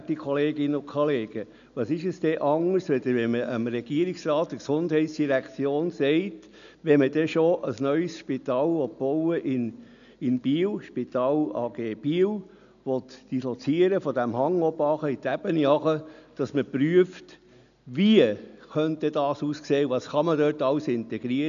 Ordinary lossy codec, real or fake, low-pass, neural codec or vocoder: none; real; 7.2 kHz; none